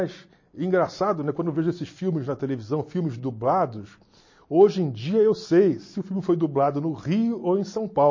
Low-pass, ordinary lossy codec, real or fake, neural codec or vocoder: 7.2 kHz; MP3, 32 kbps; real; none